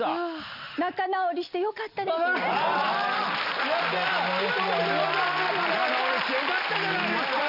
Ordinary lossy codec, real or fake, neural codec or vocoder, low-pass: none; real; none; 5.4 kHz